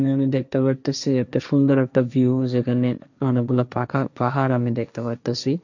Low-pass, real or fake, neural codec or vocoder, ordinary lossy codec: 7.2 kHz; fake; codec, 16 kHz, 1.1 kbps, Voila-Tokenizer; none